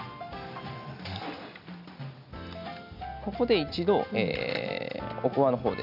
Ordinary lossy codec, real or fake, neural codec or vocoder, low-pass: none; real; none; 5.4 kHz